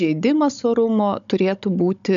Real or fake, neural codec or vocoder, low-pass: fake; codec, 16 kHz, 16 kbps, FunCodec, trained on Chinese and English, 50 frames a second; 7.2 kHz